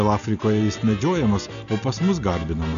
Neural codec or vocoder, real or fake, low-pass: none; real; 7.2 kHz